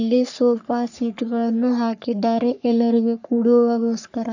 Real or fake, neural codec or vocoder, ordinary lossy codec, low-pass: fake; codec, 44.1 kHz, 3.4 kbps, Pupu-Codec; none; 7.2 kHz